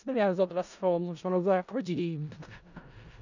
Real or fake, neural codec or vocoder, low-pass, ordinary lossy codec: fake; codec, 16 kHz in and 24 kHz out, 0.4 kbps, LongCat-Audio-Codec, four codebook decoder; 7.2 kHz; none